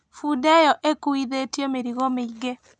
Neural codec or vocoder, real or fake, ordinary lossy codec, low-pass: none; real; none; none